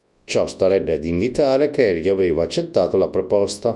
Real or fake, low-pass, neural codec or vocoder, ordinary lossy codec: fake; 10.8 kHz; codec, 24 kHz, 0.9 kbps, WavTokenizer, large speech release; Opus, 64 kbps